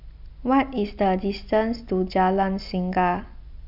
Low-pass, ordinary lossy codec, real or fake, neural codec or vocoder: 5.4 kHz; none; real; none